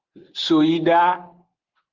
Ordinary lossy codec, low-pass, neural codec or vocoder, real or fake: Opus, 16 kbps; 7.2 kHz; none; real